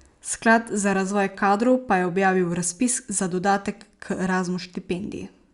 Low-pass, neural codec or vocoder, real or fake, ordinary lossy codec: 10.8 kHz; none; real; Opus, 64 kbps